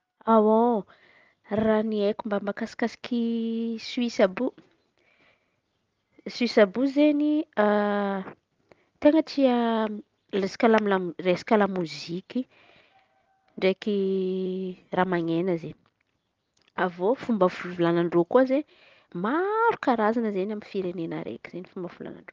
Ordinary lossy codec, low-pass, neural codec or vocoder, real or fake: Opus, 32 kbps; 7.2 kHz; none; real